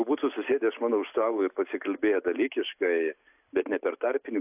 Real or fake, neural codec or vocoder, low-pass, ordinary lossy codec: real; none; 3.6 kHz; AAC, 32 kbps